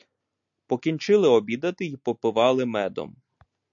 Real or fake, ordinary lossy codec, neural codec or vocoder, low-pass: real; AAC, 64 kbps; none; 7.2 kHz